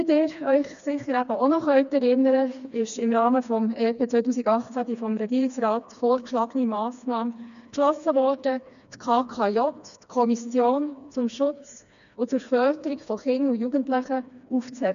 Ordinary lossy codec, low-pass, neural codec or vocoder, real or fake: none; 7.2 kHz; codec, 16 kHz, 2 kbps, FreqCodec, smaller model; fake